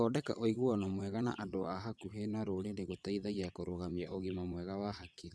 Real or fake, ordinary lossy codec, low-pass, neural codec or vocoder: fake; none; none; vocoder, 22.05 kHz, 80 mel bands, WaveNeXt